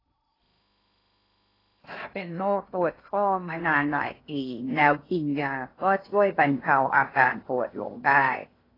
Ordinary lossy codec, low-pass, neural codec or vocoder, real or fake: AAC, 24 kbps; 5.4 kHz; codec, 16 kHz in and 24 kHz out, 0.6 kbps, FocalCodec, streaming, 2048 codes; fake